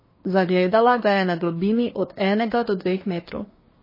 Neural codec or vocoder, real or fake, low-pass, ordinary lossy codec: codec, 24 kHz, 1 kbps, SNAC; fake; 5.4 kHz; MP3, 24 kbps